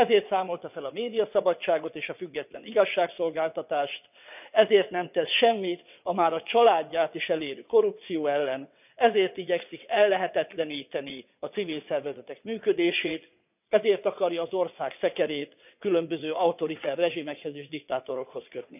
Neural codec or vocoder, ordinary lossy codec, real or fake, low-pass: vocoder, 22.05 kHz, 80 mel bands, WaveNeXt; none; fake; 3.6 kHz